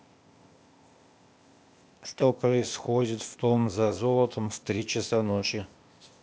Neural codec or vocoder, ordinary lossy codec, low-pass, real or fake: codec, 16 kHz, 0.8 kbps, ZipCodec; none; none; fake